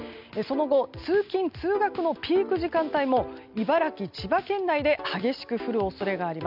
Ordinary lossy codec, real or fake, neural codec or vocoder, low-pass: none; real; none; 5.4 kHz